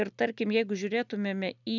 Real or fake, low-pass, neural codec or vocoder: real; 7.2 kHz; none